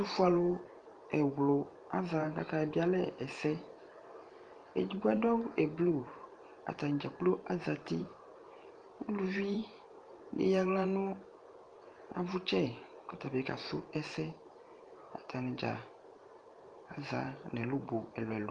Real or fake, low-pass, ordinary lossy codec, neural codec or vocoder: fake; 9.9 kHz; Opus, 32 kbps; vocoder, 44.1 kHz, 128 mel bands every 512 samples, BigVGAN v2